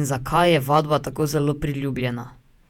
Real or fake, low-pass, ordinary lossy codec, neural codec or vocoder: fake; 19.8 kHz; none; vocoder, 48 kHz, 128 mel bands, Vocos